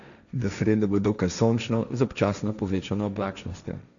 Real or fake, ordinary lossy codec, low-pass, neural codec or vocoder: fake; none; 7.2 kHz; codec, 16 kHz, 1.1 kbps, Voila-Tokenizer